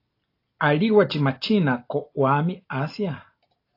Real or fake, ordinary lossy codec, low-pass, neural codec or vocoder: real; AAC, 32 kbps; 5.4 kHz; none